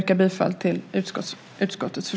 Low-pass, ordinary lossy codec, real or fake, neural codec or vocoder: none; none; real; none